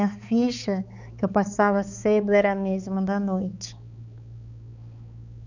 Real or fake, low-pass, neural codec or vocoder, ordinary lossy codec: fake; 7.2 kHz; codec, 16 kHz, 4 kbps, X-Codec, HuBERT features, trained on balanced general audio; none